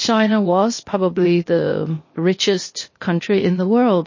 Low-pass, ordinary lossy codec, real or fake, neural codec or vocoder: 7.2 kHz; MP3, 32 kbps; fake; codec, 16 kHz, 0.8 kbps, ZipCodec